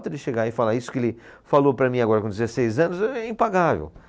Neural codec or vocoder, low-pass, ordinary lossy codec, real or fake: none; none; none; real